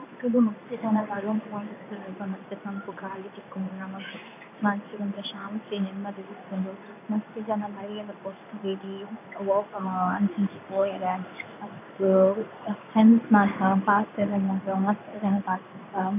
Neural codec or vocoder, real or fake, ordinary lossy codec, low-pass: codec, 16 kHz in and 24 kHz out, 1 kbps, XY-Tokenizer; fake; none; 3.6 kHz